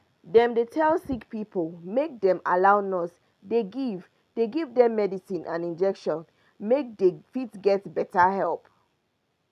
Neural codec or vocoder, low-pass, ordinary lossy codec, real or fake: none; 14.4 kHz; none; real